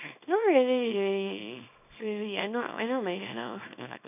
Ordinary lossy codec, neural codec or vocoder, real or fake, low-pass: none; codec, 24 kHz, 0.9 kbps, WavTokenizer, small release; fake; 3.6 kHz